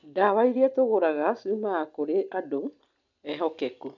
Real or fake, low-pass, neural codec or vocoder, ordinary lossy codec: real; 7.2 kHz; none; none